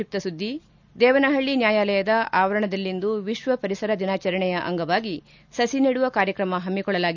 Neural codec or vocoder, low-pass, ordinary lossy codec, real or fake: none; 7.2 kHz; none; real